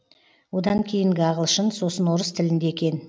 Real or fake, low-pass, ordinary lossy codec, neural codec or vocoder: real; none; none; none